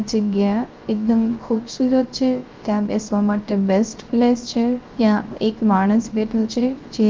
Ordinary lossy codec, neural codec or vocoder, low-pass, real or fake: Opus, 24 kbps; codec, 16 kHz, 0.3 kbps, FocalCodec; 7.2 kHz; fake